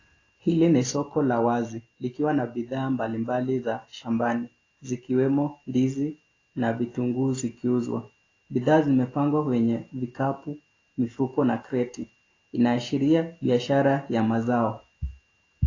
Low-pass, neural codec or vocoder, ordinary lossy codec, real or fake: 7.2 kHz; none; AAC, 32 kbps; real